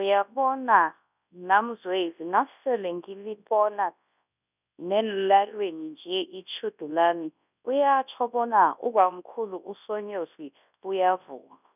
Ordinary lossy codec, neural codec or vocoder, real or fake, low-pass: none; codec, 24 kHz, 0.9 kbps, WavTokenizer, large speech release; fake; 3.6 kHz